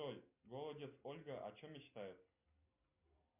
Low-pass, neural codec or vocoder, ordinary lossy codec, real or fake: 3.6 kHz; none; MP3, 32 kbps; real